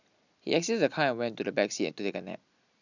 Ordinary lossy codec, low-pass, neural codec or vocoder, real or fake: none; 7.2 kHz; none; real